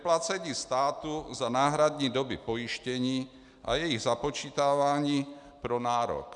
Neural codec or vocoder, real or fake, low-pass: none; real; 10.8 kHz